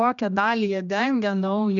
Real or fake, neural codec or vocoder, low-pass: fake; codec, 16 kHz, 1 kbps, X-Codec, HuBERT features, trained on general audio; 7.2 kHz